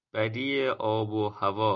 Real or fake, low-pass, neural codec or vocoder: real; 7.2 kHz; none